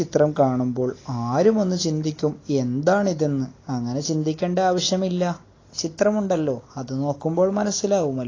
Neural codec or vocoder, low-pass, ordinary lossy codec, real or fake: none; 7.2 kHz; AAC, 32 kbps; real